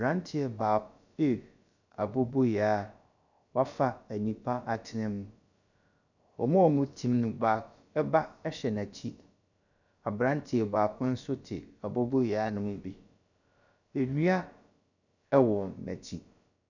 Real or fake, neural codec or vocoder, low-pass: fake; codec, 16 kHz, about 1 kbps, DyCAST, with the encoder's durations; 7.2 kHz